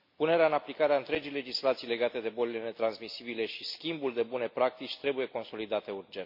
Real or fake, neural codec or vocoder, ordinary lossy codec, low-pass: real; none; MP3, 32 kbps; 5.4 kHz